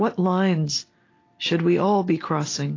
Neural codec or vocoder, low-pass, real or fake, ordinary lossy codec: none; 7.2 kHz; real; AAC, 32 kbps